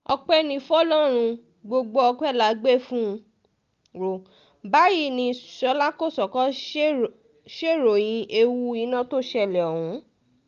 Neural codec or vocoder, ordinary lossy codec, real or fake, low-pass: none; Opus, 32 kbps; real; 7.2 kHz